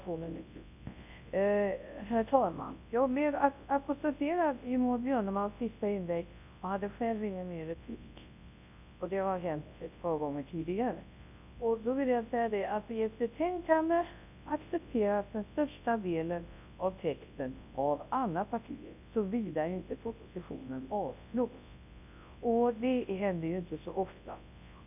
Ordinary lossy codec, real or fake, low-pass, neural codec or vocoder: none; fake; 3.6 kHz; codec, 24 kHz, 0.9 kbps, WavTokenizer, large speech release